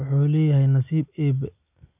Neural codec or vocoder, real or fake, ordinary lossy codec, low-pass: none; real; none; 3.6 kHz